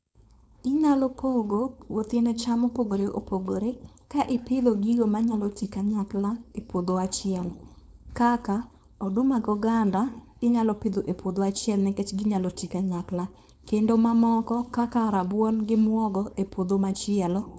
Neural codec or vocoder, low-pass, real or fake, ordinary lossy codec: codec, 16 kHz, 4.8 kbps, FACodec; none; fake; none